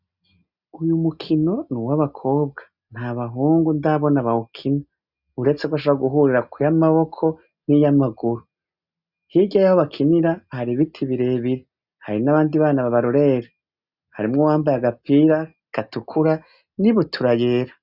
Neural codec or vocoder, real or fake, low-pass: none; real; 5.4 kHz